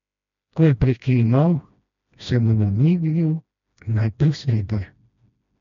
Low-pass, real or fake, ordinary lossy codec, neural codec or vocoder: 7.2 kHz; fake; MP3, 64 kbps; codec, 16 kHz, 1 kbps, FreqCodec, smaller model